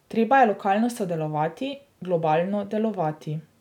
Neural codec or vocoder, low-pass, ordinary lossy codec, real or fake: none; 19.8 kHz; none; real